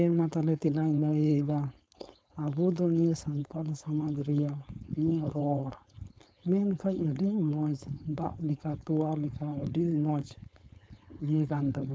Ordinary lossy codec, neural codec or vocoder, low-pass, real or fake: none; codec, 16 kHz, 4.8 kbps, FACodec; none; fake